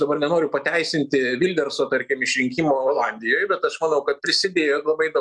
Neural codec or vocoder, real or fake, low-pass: none; real; 10.8 kHz